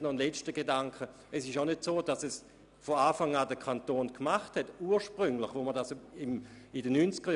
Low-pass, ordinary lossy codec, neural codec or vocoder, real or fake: 10.8 kHz; none; none; real